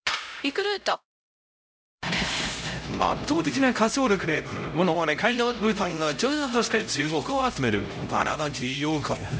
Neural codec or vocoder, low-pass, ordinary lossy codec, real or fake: codec, 16 kHz, 0.5 kbps, X-Codec, HuBERT features, trained on LibriSpeech; none; none; fake